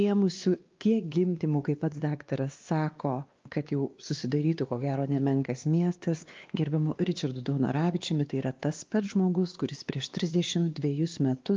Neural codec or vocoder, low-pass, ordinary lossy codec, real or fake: codec, 16 kHz, 2 kbps, X-Codec, WavLM features, trained on Multilingual LibriSpeech; 7.2 kHz; Opus, 32 kbps; fake